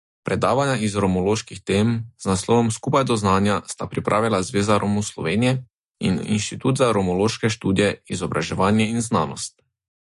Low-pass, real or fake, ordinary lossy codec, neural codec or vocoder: 14.4 kHz; real; MP3, 48 kbps; none